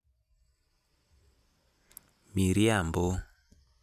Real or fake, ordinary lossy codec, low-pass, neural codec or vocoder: real; none; 14.4 kHz; none